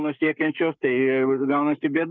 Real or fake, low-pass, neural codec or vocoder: fake; 7.2 kHz; codec, 44.1 kHz, 7.8 kbps, Pupu-Codec